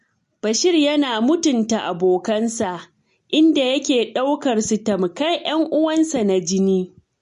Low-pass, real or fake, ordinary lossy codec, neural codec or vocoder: 14.4 kHz; real; MP3, 48 kbps; none